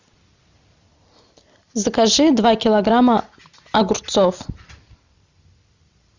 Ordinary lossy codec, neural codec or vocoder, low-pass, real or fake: Opus, 64 kbps; none; 7.2 kHz; real